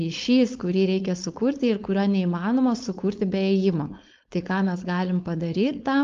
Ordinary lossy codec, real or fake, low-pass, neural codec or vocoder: Opus, 32 kbps; fake; 7.2 kHz; codec, 16 kHz, 4.8 kbps, FACodec